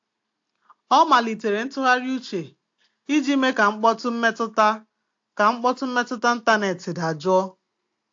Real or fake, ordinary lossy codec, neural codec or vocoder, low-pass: real; AAC, 48 kbps; none; 7.2 kHz